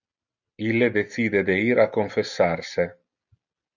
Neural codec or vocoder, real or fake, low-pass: none; real; 7.2 kHz